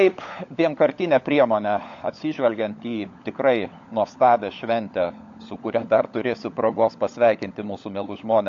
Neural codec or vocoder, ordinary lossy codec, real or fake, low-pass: codec, 16 kHz, 16 kbps, FunCodec, trained on LibriTTS, 50 frames a second; AAC, 64 kbps; fake; 7.2 kHz